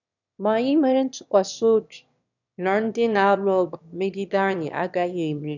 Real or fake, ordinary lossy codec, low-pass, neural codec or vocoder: fake; none; 7.2 kHz; autoencoder, 22.05 kHz, a latent of 192 numbers a frame, VITS, trained on one speaker